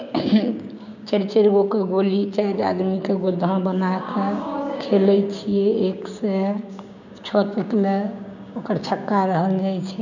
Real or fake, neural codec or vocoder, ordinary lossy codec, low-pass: fake; codec, 16 kHz, 6 kbps, DAC; none; 7.2 kHz